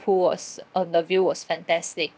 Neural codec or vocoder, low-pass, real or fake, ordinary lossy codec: codec, 16 kHz, 0.7 kbps, FocalCodec; none; fake; none